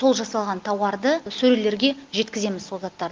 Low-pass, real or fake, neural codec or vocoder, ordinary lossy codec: 7.2 kHz; real; none; Opus, 32 kbps